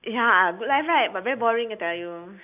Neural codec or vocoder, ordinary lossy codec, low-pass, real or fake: none; none; 3.6 kHz; real